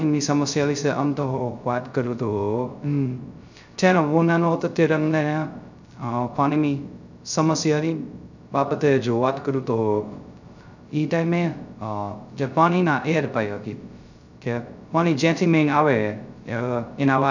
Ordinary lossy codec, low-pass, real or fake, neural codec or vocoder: none; 7.2 kHz; fake; codec, 16 kHz, 0.2 kbps, FocalCodec